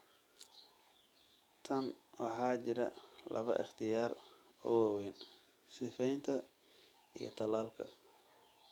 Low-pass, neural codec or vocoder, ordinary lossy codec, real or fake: 19.8 kHz; codec, 44.1 kHz, 7.8 kbps, DAC; MP3, 96 kbps; fake